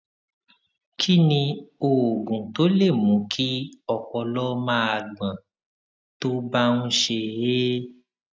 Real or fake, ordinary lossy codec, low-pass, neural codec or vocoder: real; none; none; none